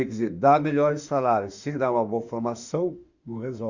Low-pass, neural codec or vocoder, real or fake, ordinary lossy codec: 7.2 kHz; autoencoder, 48 kHz, 32 numbers a frame, DAC-VAE, trained on Japanese speech; fake; Opus, 64 kbps